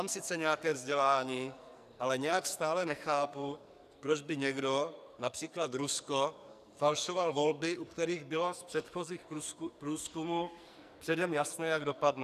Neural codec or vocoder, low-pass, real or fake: codec, 44.1 kHz, 2.6 kbps, SNAC; 14.4 kHz; fake